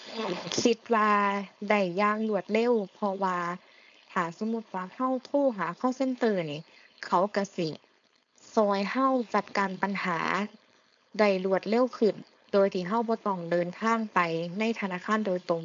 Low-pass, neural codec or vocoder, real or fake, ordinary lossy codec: 7.2 kHz; codec, 16 kHz, 4.8 kbps, FACodec; fake; AAC, 64 kbps